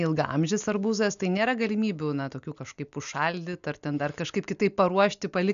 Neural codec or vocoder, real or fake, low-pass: none; real; 7.2 kHz